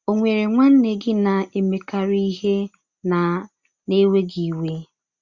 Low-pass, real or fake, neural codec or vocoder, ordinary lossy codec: 7.2 kHz; real; none; Opus, 64 kbps